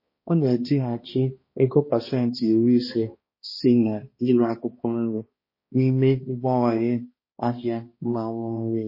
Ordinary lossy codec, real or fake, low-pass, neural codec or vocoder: MP3, 24 kbps; fake; 5.4 kHz; codec, 16 kHz, 1 kbps, X-Codec, HuBERT features, trained on balanced general audio